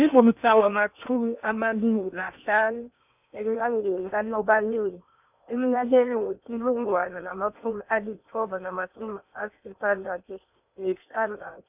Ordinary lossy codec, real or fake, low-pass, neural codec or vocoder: none; fake; 3.6 kHz; codec, 16 kHz in and 24 kHz out, 0.8 kbps, FocalCodec, streaming, 65536 codes